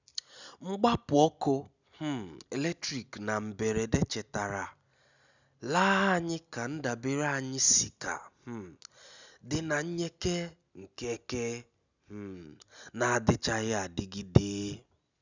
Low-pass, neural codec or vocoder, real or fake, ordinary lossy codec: 7.2 kHz; none; real; none